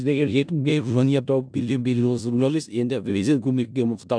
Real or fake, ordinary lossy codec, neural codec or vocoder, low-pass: fake; none; codec, 16 kHz in and 24 kHz out, 0.4 kbps, LongCat-Audio-Codec, four codebook decoder; 9.9 kHz